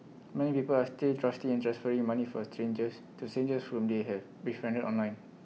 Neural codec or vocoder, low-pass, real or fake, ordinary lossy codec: none; none; real; none